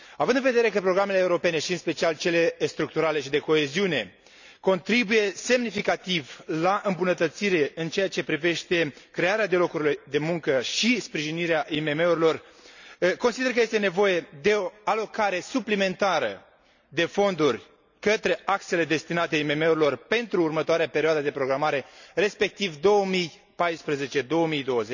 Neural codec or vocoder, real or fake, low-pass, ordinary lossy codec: none; real; 7.2 kHz; none